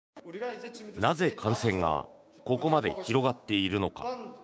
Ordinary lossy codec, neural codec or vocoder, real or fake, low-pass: none; codec, 16 kHz, 6 kbps, DAC; fake; none